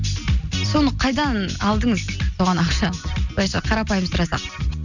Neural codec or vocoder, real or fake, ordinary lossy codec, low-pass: none; real; none; 7.2 kHz